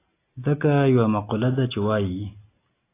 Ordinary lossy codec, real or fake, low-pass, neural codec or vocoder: AAC, 24 kbps; real; 3.6 kHz; none